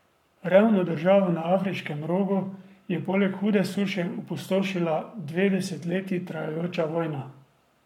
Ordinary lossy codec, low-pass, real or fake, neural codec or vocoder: MP3, 96 kbps; 19.8 kHz; fake; codec, 44.1 kHz, 7.8 kbps, Pupu-Codec